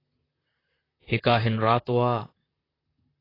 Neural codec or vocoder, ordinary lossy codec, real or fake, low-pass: vocoder, 44.1 kHz, 128 mel bands, Pupu-Vocoder; AAC, 24 kbps; fake; 5.4 kHz